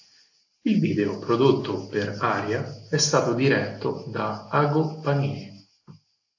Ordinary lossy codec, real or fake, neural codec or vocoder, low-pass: AAC, 48 kbps; real; none; 7.2 kHz